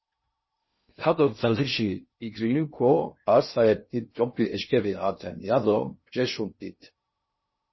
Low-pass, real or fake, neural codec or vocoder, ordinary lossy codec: 7.2 kHz; fake; codec, 16 kHz in and 24 kHz out, 0.6 kbps, FocalCodec, streaming, 2048 codes; MP3, 24 kbps